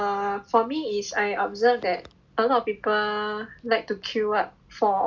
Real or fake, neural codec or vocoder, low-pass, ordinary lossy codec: real; none; none; none